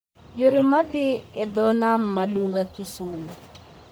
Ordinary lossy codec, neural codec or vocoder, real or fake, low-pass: none; codec, 44.1 kHz, 1.7 kbps, Pupu-Codec; fake; none